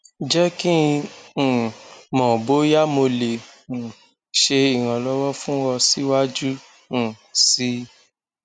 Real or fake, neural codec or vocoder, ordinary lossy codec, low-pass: real; none; none; 9.9 kHz